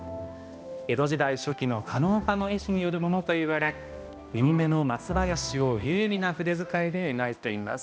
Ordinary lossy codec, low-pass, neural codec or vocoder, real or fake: none; none; codec, 16 kHz, 1 kbps, X-Codec, HuBERT features, trained on balanced general audio; fake